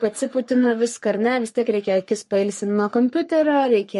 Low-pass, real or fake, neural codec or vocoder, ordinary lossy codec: 14.4 kHz; fake; codec, 44.1 kHz, 3.4 kbps, Pupu-Codec; MP3, 48 kbps